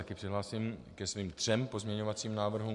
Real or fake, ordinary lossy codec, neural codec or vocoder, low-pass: real; MP3, 64 kbps; none; 10.8 kHz